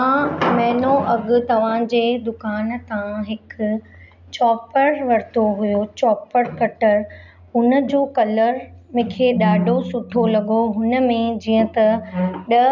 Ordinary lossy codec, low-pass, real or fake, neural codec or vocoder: none; 7.2 kHz; real; none